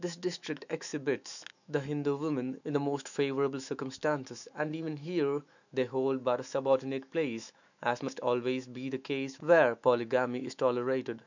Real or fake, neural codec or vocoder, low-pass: fake; autoencoder, 48 kHz, 128 numbers a frame, DAC-VAE, trained on Japanese speech; 7.2 kHz